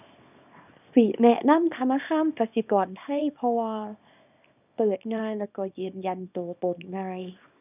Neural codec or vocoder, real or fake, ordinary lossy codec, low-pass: codec, 24 kHz, 0.9 kbps, WavTokenizer, small release; fake; none; 3.6 kHz